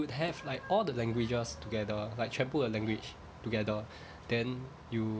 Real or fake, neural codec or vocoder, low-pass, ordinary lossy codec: real; none; none; none